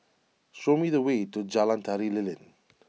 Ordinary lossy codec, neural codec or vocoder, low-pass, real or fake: none; none; none; real